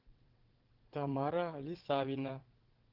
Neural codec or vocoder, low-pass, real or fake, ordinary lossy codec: vocoder, 22.05 kHz, 80 mel bands, WaveNeXt; 5.4 kHz; fake; Opus, 16 kbps